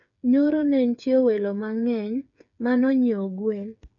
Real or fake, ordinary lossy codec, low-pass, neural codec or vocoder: fake; none; 7.2 kHz; codec, 16 kHz, 8 kbps, FreqCodec, smaller model